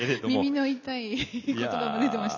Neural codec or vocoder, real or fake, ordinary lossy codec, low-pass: none; real; none; 7.2 kHz